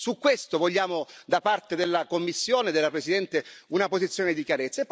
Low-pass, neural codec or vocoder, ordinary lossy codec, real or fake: none; none; none; real